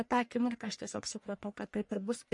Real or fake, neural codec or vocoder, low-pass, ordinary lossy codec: fake; codec, 44.1 kHz, 1.7 kbps, Pupu-Codec; 10.8 kHz; MP3, 48 kbps